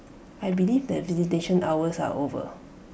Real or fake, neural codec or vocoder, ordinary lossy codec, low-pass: real; none; none; none